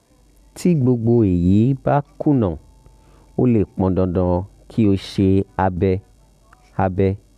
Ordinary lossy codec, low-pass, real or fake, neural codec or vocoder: none; 14.4 kHz; real; none